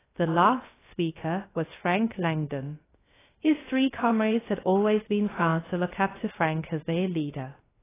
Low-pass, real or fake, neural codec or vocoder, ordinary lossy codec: 3.6 kHz; fake; codec, 16 kHz, 0.3 kbps, FocalCodec; AAC, 16 kbps